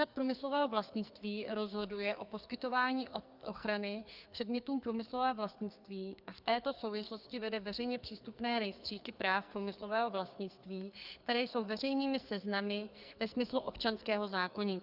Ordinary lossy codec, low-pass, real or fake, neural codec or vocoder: Opus, 64 kbps; 5.4 kHz; fake; codec, 44.1 kHz, 2.6 kbps, SNAC